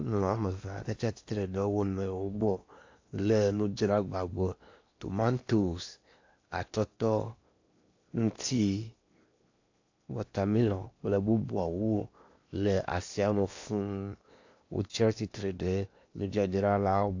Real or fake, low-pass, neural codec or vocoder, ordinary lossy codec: fake; 7.2 kHz; codec, 16 kHz in and 24 kHz out, 0.8 kbps, FocalCodec, streaming, 65536 codes; AAC, 48 kbps